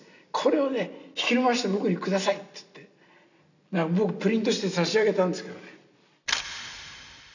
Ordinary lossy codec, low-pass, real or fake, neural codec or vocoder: none; 7.2 kHz; real; none